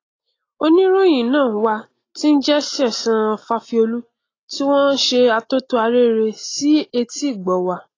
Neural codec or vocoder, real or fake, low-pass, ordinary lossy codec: none; real; 7.2 kHz; AAC, 32 kbps